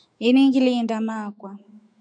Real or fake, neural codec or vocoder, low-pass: fake; autoencoder, 48 kHz, 128 numbers a frame, DAC-VAE, trained on Japanese speech; 9.9 kHz